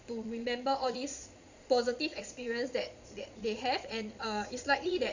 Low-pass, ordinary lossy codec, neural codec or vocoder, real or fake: 7.2 kHz; Opus, 64 kbps; vocoder, 22.05 kHz, 80 mel bands, Vocos; fake